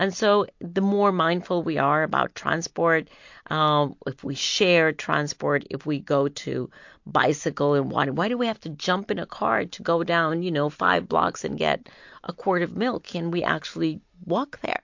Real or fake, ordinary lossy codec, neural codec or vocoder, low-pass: real; MP3, 48 kbps; none; 7.2 kHz